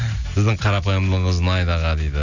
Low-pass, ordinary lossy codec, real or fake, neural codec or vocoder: 7.2 kHz; none; real; none